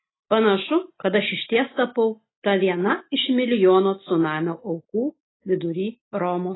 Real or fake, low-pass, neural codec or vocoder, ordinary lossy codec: real; 7.2 kHz; none; AAC, 16 kbps